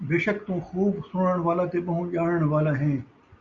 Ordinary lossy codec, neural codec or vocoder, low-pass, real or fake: Opus, 64 kbps; none; 7.2 kHz; real